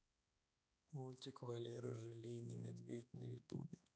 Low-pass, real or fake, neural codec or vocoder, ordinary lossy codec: none; fake; codec, 16 kHz, 2 kbps, X-Codec, HuBERT features, trained on balanced general audio; none